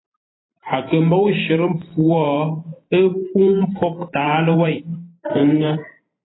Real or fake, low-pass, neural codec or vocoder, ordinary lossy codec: fake; 7.2 kHz; vocoder, 44.1 kHz, 128 mel bands every 512 samples, BigVGAN v2; AAC, 16 kbps